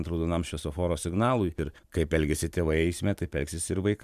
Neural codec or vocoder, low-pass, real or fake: vocoder, 44.1 kHz, 128 mel bands every 512 samples, BigVGAN v2; 14.4 kHz; fake